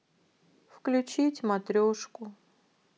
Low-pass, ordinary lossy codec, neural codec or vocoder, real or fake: none; none; none; real